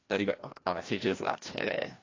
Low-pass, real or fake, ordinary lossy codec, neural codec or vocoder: 7.2 kHz; fake; AAC, 32 kbps; codec, 16 kHz, 1 kbps, FreqCodec, larger model